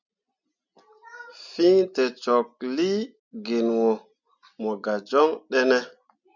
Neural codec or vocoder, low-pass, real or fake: none; 7.2 kHz; real